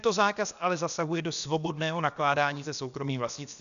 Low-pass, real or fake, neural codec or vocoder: 7.2 kHz; fake; codec, 16 kHz, about 1 kbps, DyCAST, with the encoder's durations